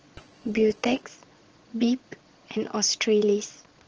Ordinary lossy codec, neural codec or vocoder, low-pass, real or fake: Opus, 16 kbps; vocoder, 22.05 kHz, 80 mel bands, Vocos; 7.2 kHz; fake